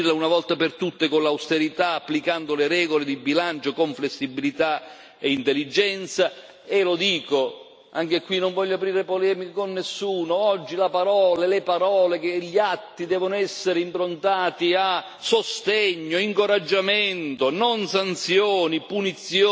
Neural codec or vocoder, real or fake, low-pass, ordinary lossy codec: none; real; none; none